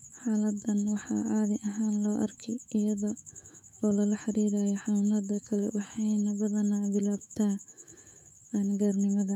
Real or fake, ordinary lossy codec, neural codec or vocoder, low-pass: fake; none; autoencoder, 48 kHz, 128 numbers a frame, DAC-VAE, trained on Japanese speech; 19.8 kHz